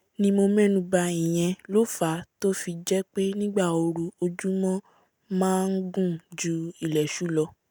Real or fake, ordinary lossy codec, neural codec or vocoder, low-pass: real; none; none; none